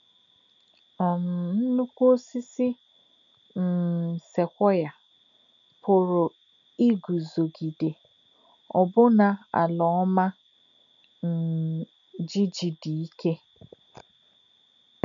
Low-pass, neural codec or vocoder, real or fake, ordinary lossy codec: 7.2 kHz; none; real; none